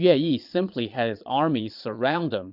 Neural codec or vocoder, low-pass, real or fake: vocoder, 22.05 kHz, 80 mel bands, Vocos; 5.4 kHz; fake